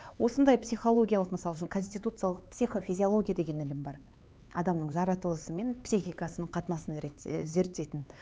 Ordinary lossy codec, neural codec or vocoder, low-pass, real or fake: none; codec, 16 kHz, 4 kbps, X-Codec, HuBERT features, trained on LibriSpeech; none; fake